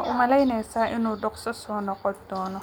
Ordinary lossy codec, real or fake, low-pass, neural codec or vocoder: none; real; none; none